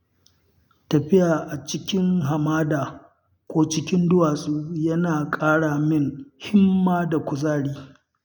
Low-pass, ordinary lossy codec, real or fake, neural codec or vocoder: 19.8 kHz; none; real; none